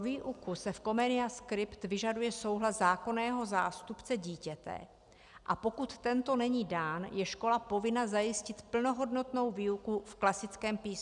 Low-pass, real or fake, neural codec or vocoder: 10.8 kHz; real; none